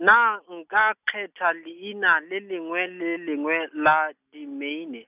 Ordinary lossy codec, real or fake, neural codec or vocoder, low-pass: none; real; none; 3.6 kHz